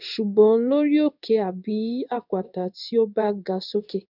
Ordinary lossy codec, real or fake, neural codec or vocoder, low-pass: none; fake; codec, 16 kHz in and 24 kHz out, 1 kbps, XY-Tokenizer; 5.4 kHz